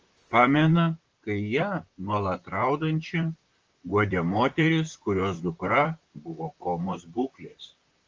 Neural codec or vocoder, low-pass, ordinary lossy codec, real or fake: vocoder, 44.1 kHz, 128 mel bands, Pupu-Vocoder; 7.2 kHz; Opus, 24 kbps; fake